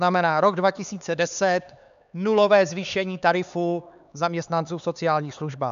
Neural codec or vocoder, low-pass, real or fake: codec, 16 kHz, 4 kbps, X-Codec, HuBERT features, trained on LibriSpeech; 7.2 kHz; fake